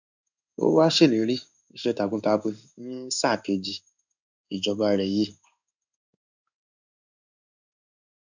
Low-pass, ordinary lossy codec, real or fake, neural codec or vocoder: 7.2 kHz; none; fake; codec, 16 kHz in and 24 kHz out, 1 kbps, XY-Tokenizer